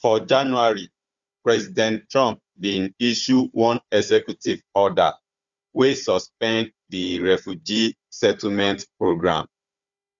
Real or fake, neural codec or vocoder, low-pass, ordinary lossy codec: fake; codec, 16 kHz, 4 kbps, FunCodec, trained on Chinese and English, 50 frames a second; 7.2 kHz; Opus, 64 kbps